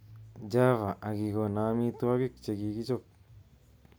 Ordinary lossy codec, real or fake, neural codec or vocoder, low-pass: none; real; none; none